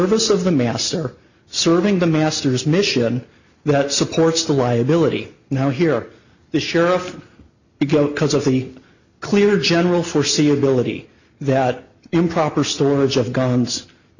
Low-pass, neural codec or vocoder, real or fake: 7.2 kHz; none; real